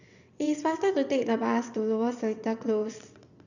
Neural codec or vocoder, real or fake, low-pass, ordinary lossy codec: vocoder, 22.05 kHz, 80 mel bands, WaveNeXt; fake; 7.2 kHz; none